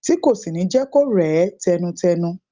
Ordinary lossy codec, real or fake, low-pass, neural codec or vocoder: Opus, 32 kbps; real; 7.2 kHz; none